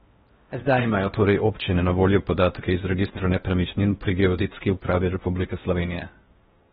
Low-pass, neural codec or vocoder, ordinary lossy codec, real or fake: 10.8 kHz; codec, 16 kHz in and 24 kHz out, 0.6 kbps, FocalCodec, streaming, 4096 codes; AAC, 16 kbps; fake